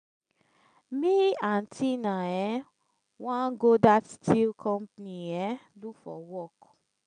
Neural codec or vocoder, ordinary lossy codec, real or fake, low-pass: none; none; real; 9.9 kHz